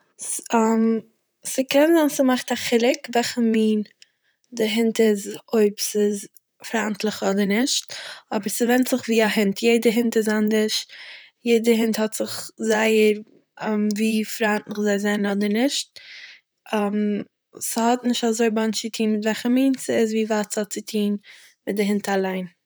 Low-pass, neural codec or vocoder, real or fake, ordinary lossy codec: none; vocoder, 44.1 kHz, 128 mel bands, Pupu-Vocoder; fake; none